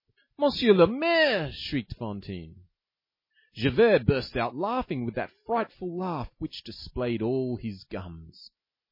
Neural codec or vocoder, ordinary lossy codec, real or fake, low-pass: none; MP3, 24 kbps; real; 5.4 kHz